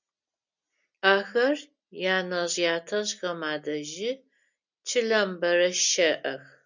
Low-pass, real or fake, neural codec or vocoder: 7.2 kHz; real; none